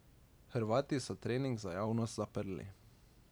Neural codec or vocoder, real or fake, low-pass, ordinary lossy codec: none; real; none; none